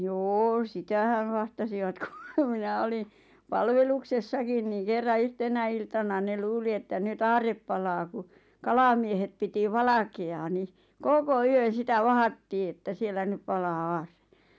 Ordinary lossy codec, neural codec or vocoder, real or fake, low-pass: none; none; real; none